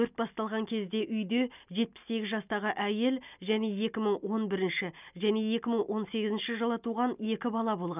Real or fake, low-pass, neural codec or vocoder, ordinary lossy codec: real; 3.6 kHz; none; none